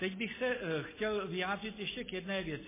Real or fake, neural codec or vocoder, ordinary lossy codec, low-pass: real; none; MP3, 16 kbps; 3.6 kHz